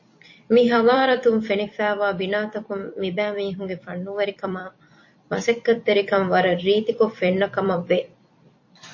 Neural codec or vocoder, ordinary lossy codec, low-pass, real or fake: vocoder, 44.1 kHz, 128 mel bands every 256 samples, BigVGAN v2; MP3, 32 kbps; 7.2 kHz; fake